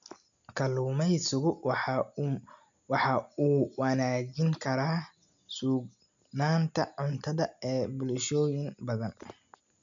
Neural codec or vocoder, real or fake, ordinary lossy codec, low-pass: none; real; MP3, 64 kbps; 7.2 kHz